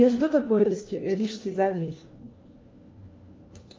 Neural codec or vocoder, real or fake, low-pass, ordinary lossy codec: codec, 16 kHz, 1 kbps, FunCodec, trained on LibriTTS, 50 frames a second; fake; 7.2 kHz; Opus, 24 kbps